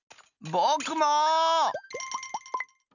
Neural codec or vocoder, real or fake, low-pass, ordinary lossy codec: none; real; 7.2 kHz; none